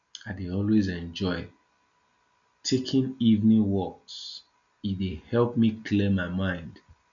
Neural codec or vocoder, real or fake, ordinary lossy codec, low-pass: none; real; none; 7.2 kHz